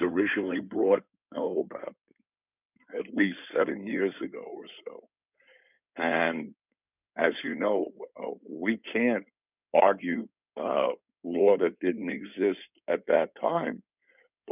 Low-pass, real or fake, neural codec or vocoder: 3.6 kHz; fake; codec, 16 kHz in and 24 kHz out, 2.2 kbps, FireRedTTS-2 codec